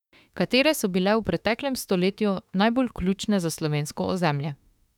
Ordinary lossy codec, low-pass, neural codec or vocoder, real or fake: none; 19.8 kHz; autoencoder, 48 kHz, 32 numbers a frame, DAC-VAE, trained on Japanese speech; fake